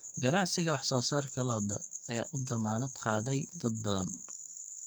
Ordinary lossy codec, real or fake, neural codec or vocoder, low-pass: none; fake; codec, 44.1 kHz, 2.6 kbps, SNAC; none